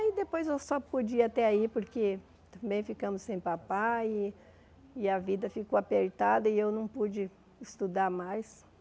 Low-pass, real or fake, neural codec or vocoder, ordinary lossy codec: none; real; none; none